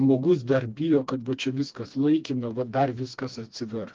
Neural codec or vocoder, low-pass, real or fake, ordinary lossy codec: codec, 16 kHz, 2 kbps, FreqCodec, smaller model; 7.2 kHz; fake; Opus, 32 kbps